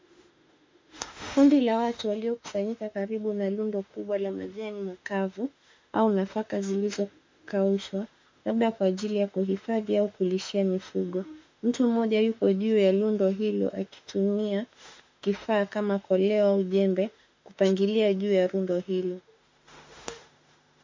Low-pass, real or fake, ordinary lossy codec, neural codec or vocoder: 7.2 kHz; fake; MP3, 64 kbps; autoencoder, 48 kHz, 32 numbers a frame, DAC-VAE, trained on Japanese speech